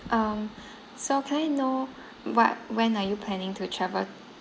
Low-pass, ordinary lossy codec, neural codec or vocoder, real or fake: none; none; none; real